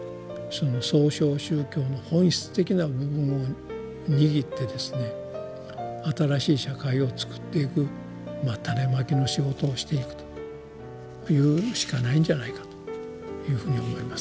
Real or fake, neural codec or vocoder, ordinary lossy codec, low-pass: real; none; none; none